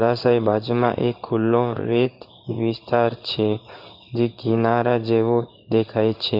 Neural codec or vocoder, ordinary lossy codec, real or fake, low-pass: codec, 16 kHz in and 24 kHz out, 1 kbps, XY-Tokenizer; none; fake; 5.4 kHz